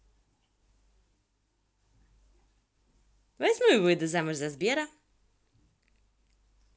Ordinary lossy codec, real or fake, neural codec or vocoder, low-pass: none; real; none; none